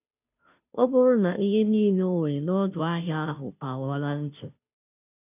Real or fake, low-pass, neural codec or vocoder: fake; 3.6 kHz; codec, 16 kHz, 0.5 kbps, FunCodec, trained on Chinese and English, 25 frames a second